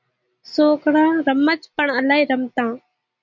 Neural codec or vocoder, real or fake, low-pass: none; real; 7.2 kHz